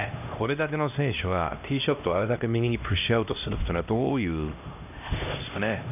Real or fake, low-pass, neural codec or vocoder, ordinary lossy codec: fake; 3.6 kHz; codec, 16 kHz, 1 kbps, X-Codec, HuBERT features, trained on LibriSpeech; none